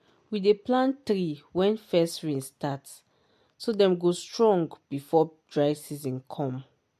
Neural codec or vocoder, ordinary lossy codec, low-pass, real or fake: none; MP3, 64 kbps; 14.4 kHz; real